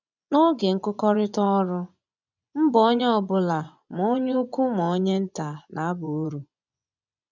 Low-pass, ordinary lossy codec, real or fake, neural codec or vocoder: 7.2 kHz; none; fake; vocoder, 24 kHz, 100 mel bands, Vocos